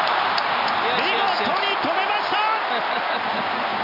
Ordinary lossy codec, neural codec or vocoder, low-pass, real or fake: none; none; 5.4 kHz; real